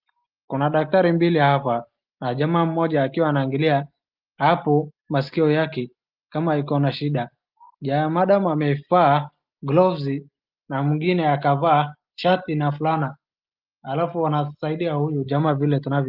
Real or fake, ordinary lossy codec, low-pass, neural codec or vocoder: real; Opus, 32 kbps; 5.4 kHz; none